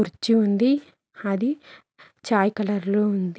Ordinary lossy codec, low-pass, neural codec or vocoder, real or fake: none; none; none; real